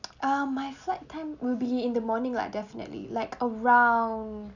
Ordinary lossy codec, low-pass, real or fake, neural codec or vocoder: none; 7.2 kHz; real; none